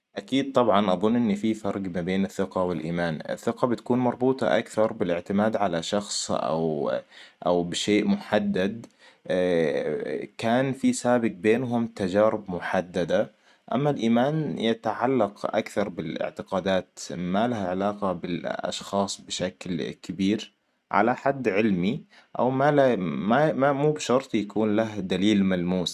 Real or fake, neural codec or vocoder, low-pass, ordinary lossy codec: real; none; 14.4 kHz; none